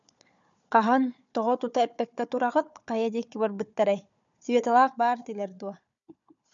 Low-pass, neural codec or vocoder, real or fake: 7.2 kHz; codec, 16 kHz, 4 kbps, FunCodec, trained on Chinese and English, 50 frames a second; fake